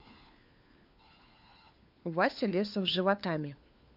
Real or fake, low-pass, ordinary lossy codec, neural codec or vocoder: fake; 5.4 kHz; none; codec, 16 kHz, 4 kbps, FunCodec, trained on LibriTTS, 50 frames a second